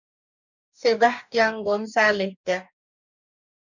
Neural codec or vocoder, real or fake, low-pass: codec, 44.1 kHz, 2.6 kbps, DAC; fake; 7.2 kHz